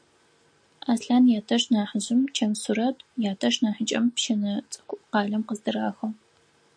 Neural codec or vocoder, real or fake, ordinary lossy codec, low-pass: none; real; MP3, 48 kbps; 9.9 kHz